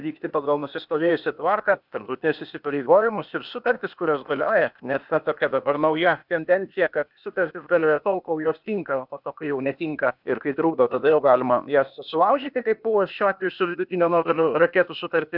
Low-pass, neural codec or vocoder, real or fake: 5.4 kHz; codec, 16 kHz, 0.8 kbps, ZipCodec; fake